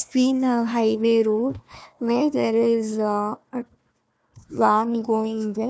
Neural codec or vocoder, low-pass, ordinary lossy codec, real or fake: codec, 16 kHz, 1 kbps, FunCodec, trained on Chinese and English, 50 frames a second; none; none; fake